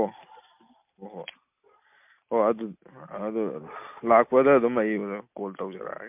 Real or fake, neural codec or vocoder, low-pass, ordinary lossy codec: real; none; 3.6 kHz; MP3, 32 kbps